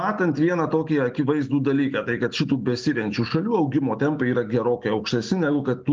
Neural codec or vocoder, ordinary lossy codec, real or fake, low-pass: none; Opus, 32 kbps; real; 7.2 kHz